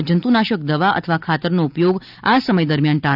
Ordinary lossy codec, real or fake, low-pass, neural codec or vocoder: none; real; 5.4 kHz; none